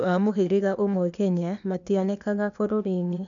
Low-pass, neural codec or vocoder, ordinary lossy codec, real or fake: 7.2 kHz; codec, 16 kHz, 0.8 kbps, ZipCodec; none; fake